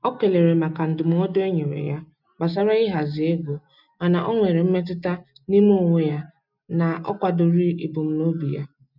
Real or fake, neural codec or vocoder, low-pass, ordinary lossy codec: real; none; 5.4 kHz; none